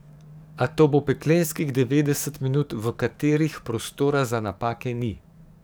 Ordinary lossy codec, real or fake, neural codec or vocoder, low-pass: none; fake; codec, 44.1 kHz, 7.8 kbps, DAC; none